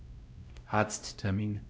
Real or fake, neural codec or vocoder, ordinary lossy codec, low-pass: fake; codec, 16 kHz, 0.5 kbps, X-Codec, WavLM features, trained on Multilingual LibriSpeech; none; none